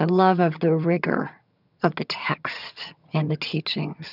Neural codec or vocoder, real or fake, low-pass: vocoder, 22.05 kHz, 80 mel bands, HiFi-GAN; fake; 5.4 kHz